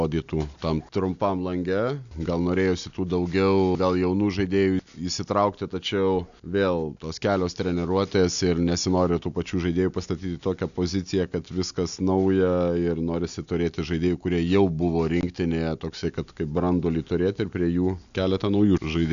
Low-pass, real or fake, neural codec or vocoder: 7.2 kHz; real; none